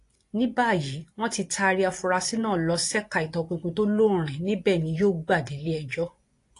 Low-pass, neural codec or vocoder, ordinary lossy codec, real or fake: 10.8 kHz; vocoder, 24 kHz, 100 mel bands, Vocos; AAC, 48 kbps; fake